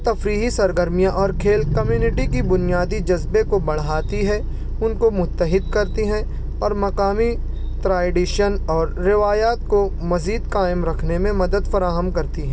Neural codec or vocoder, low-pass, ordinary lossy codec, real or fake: none; none; none; real